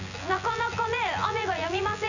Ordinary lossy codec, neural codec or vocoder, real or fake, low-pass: none; vocoder, 24 kHz, 100 mel bands, Vocos; fake; 7.2 kHz